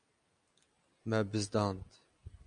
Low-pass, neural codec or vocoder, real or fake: 9.9 kHz; none; real